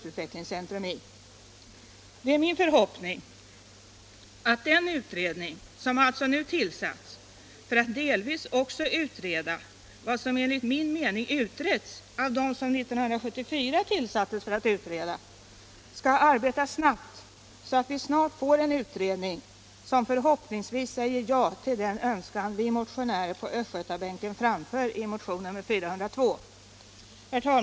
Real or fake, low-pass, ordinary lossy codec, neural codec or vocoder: real; none; none; none